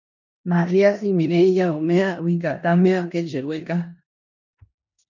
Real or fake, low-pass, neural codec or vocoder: fake; 7.2 kHz; codec, 16 kHz in and 24 kHz out, 0.9 kbps, LongCat-Audio-Codec, four codebook decoder